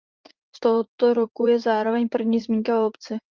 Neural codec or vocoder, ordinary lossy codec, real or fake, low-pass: vocoder, 44.1 kHz, 80 mel bands, Vocos; Opus, 32 kbps; fake; 7.2 kHz